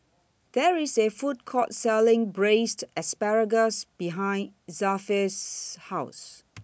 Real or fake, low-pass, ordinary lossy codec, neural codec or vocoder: real; none; none; none